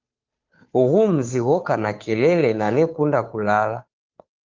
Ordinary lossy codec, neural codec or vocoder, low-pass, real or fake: Opus, 24 kbps; codec, 16 kHz, 2 kbps, FunCodec, trained on Chinese and English, 25 frames a second; 7.2 kHz; fake